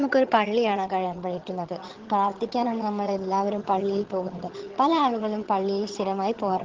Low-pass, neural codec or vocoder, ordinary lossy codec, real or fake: 7.2 kHz; vocoder, 22.05 kHz, 80 mel bands, HiFi-GAN; Opus, 16 kbps; fake